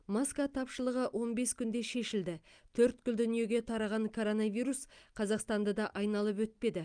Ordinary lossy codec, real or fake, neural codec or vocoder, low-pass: Opus, 32 kbps; real; none; 9.9 kHz